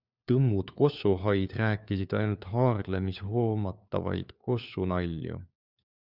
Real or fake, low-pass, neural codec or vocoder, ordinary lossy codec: fake; 5.4 kHz; codec, 16 kHz, 4 kbps, FunCodec, trained on LibriTTS, 50 frames a second; Opus, 64 kbps